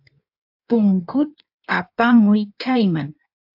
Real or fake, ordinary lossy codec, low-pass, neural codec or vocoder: fake; AAC, 48 kbps; 5.4 kHz; codec, 16 kHz in and 24 kHz out, 1.1 kbps, FireRedTTS-2 codec